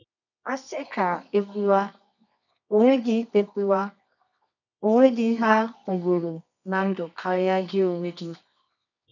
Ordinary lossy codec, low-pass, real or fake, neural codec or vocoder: none; 7.2 kHz; fake; codec, 24 kHz, 0.9 kbps, WavTokenizer, medium music audio release